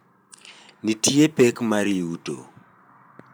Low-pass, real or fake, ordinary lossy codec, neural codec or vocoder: none; fake; none; vocoder, 44.1 kHz, 128 mel bands every 512 samples, BigVGAN v2